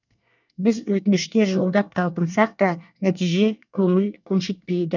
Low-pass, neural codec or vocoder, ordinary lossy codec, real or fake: 7.2 kHz; codec, 24 kHz, 1 kbps, SNAC; none; fake